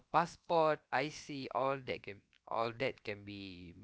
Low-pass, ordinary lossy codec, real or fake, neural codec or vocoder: none; none; fake; codec, 16 kHz, about 1 kbps, DyCAST, with the encoder's durations